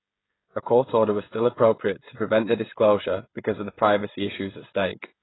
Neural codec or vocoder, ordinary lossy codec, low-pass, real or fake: codec, 16 kHz, 16 kbps, FreqCodec, smaller model; AAC, 16 kbps; 7.2 kHz; fake